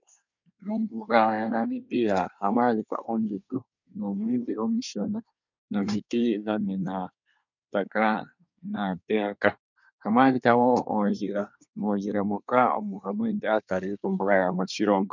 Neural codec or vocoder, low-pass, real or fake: codec, 24 kHz, 1 kbps, SNAC; 7.2 kHz; fake